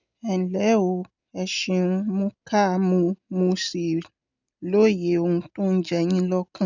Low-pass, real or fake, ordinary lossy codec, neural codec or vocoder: 7.2 kHz; real; none; none